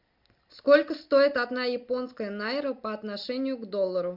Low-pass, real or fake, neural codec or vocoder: 5.4 kHz; real; none